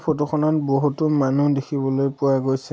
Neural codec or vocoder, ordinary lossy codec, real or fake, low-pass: none; none; real; none